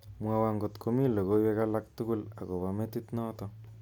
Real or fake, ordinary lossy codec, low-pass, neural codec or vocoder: real; none; 19.8 kHz; none